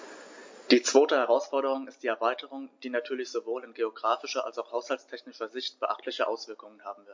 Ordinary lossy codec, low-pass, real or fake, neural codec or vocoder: MP3, 48 kbps; 7.2 kHz; real; none